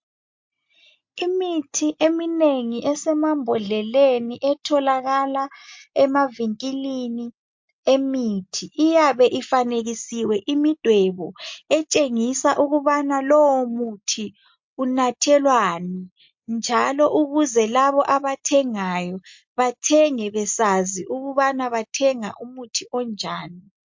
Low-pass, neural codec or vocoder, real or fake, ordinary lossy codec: 7.2 kHz; none; real; MP3, 48 kbps